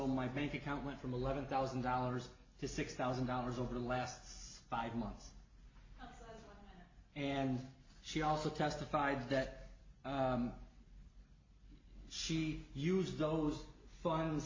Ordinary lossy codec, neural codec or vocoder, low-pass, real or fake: MP3, 32 kbps; none; 7.2 kHz; real